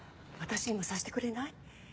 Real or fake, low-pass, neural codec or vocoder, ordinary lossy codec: real; none; none; none